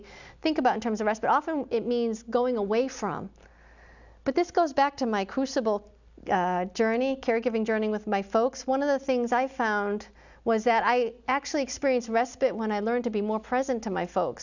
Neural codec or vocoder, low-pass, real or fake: none; 7.2 kHz; real